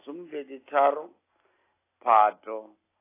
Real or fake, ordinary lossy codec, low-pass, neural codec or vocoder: real; AAC, 16 kbps; 3.6 kHz; none